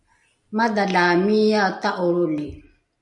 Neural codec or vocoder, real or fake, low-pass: none; real; 10.8 kHz